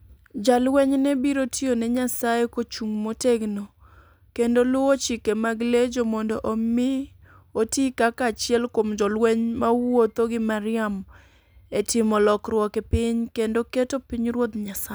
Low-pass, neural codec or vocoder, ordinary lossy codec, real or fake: none; none; none; real